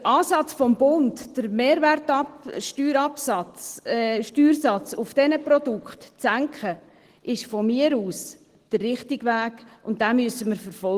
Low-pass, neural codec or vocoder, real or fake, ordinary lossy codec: 14.4 kHz; none; real; Opus, 16 kbps